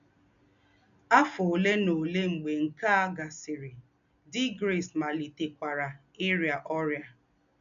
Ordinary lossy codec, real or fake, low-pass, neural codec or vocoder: none; real; 7.2 kHz; none